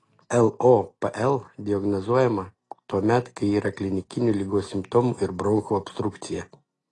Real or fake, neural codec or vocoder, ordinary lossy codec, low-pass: real; none; AAC, 32 kbps; 10.8 kHz